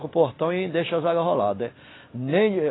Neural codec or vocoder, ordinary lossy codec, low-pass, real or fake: none; AAC, 16 kbps; 7.2 kHz; real